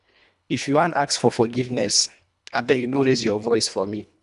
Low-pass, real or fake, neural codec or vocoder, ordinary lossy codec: 10.8 kHz; fake; codec, 24 kHz, 1.5 kbps, HILCodec; none